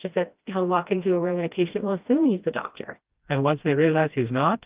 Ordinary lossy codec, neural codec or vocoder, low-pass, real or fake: Opus, 32 kbps; codec, 16 kHz, 1 kbps, FreqCodec, smaller model; 3.6 kHz; fake